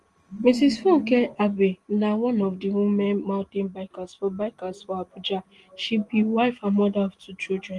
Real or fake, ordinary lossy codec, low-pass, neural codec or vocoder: real; Opus, 24 kbps; 10.8 kHz; none